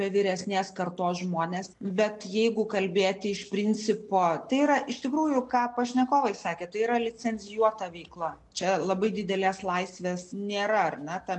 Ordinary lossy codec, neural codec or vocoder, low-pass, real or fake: MP3, 64 kbps; none; 10.8 kHz; real